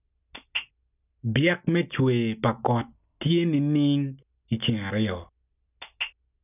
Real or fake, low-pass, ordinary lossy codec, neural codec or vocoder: real; 3.6 kHz; none; none